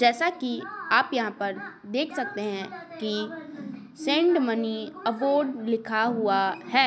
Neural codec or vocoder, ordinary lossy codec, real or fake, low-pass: none; none; real; none